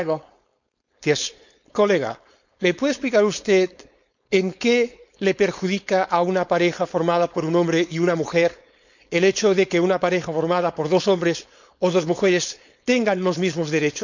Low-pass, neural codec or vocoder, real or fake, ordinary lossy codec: 7.2 kHz; codec, 16 kHz, 4.8 kbps, FACodec; fake; none